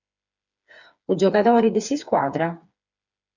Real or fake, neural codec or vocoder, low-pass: fake; codec, 16 kHz, 4 kbps, FreqCodec, smaller model; 7.2 kHz